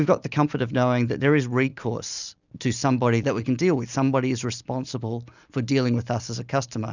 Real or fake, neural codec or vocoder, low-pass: real; none; 7.2 kHz